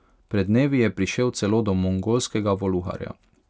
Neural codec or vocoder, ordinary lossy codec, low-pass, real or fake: none; none; none; real